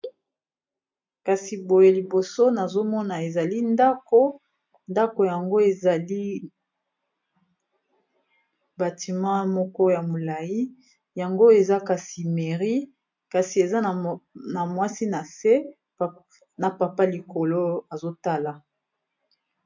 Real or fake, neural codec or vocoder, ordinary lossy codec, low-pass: real; none; MP3, 48 kbps; 7.2 kHz